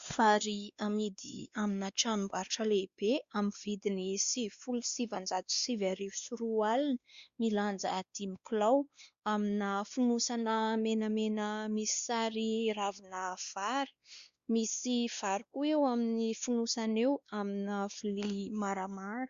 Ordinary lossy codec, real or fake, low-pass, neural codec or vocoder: Opus, 64 kbps; fake; 7.2 kHz; codec, 16 kHz, 2 kbps, X-Codec, WavLM features, trained on Multilingual LibriSpeech